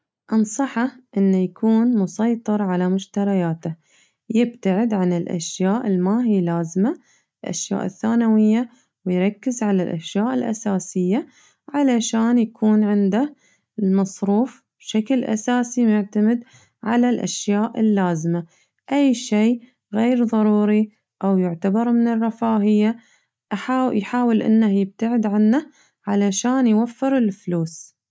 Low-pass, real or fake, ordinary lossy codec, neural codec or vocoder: none; real; none; none